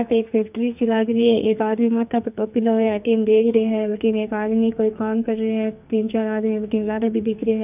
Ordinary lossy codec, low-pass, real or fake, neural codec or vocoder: none; 3.6 kHz; fake; codec, 44.1 kHz, 2.6 kbps, SNAC